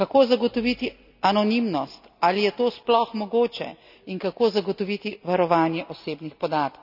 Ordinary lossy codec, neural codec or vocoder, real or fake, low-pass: none; none; real; 5.4 kHz